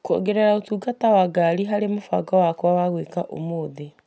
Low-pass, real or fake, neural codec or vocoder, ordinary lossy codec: none; real; none; none